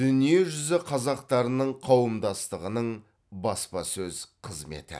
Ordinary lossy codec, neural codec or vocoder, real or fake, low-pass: none; none; real; none